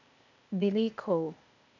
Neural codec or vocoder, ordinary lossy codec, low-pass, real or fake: codec, 16 kHz, 0.8 kbps, ZipCodec; none; 7.2 kHz; fake